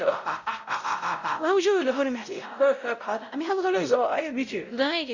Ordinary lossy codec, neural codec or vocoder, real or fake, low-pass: Opus, 64 kbps; codec, 16 kHz, 0.5 kbps, X-Codec, WavLM features, trained on Multilingual LibriSpeech; fake; 7.2 kHz